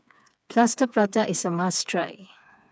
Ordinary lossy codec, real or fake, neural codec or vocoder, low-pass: none; fake; codec, 16 kHz, 4 kbps, FreqCodec, smaller model; none